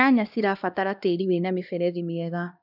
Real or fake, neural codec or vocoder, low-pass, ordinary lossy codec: fake; codec, 16 kHz, 1 kbps, X-Codec, HuBERT features, trained on LibriSpeech; 5.4 kHz; none